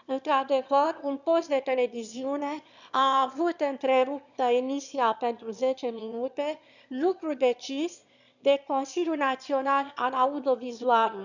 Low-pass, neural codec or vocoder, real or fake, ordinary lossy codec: 7.2 kHz; autoencoder, 22.05 kHz, a latent of 192 numbers a frame, VITS, trained on one speaker; fake; none